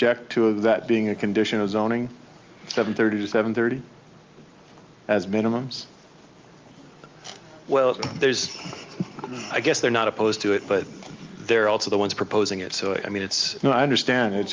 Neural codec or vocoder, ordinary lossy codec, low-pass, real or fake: none; Opus, 32 kbps; 7.2 kHz; real